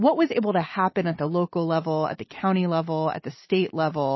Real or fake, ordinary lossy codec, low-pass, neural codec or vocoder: real; MP3, 24 kbps; 7.2 kHz; none